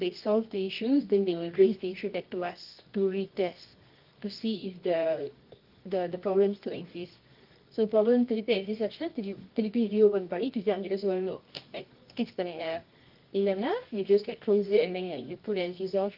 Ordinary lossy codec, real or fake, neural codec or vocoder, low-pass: Opus, 24 kbps; fake; codec, 24 kHz, 0.9 kbps, WavTokenizer, medium music audio release; 5.4 kHz